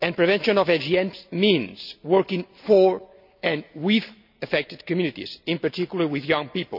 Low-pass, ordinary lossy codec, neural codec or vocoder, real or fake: 5.4 kHz; none; none; real